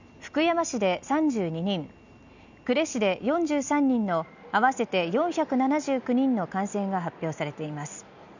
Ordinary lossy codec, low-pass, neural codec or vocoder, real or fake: none; 7.2 kHz; none; real